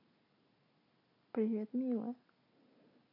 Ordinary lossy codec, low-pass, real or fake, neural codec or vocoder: AAC, 48 kbps; 5.4 kHz; real; none